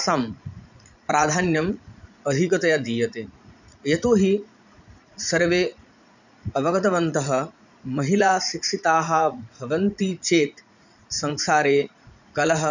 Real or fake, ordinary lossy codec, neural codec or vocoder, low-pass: fake; none; vocoder, 44.1 kHz, 80 mel bands, Vocos; 7.2 kHz